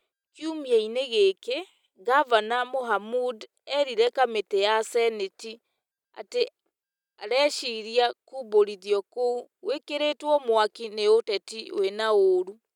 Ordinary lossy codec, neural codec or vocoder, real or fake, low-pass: none; none; real; 19.8 kHz